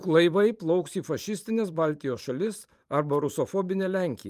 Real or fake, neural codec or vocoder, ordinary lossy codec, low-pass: fake; vocoder, 44.1 kHz, 128 mel bands every 512 samples, BigVGAN v2; Opus, 32 kbps; 14.4 kHz